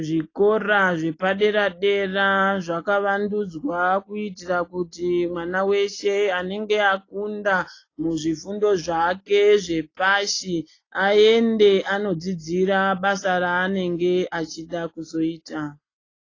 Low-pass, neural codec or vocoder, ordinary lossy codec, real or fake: 7.2 kHz; none; AAC, 32 kbps; real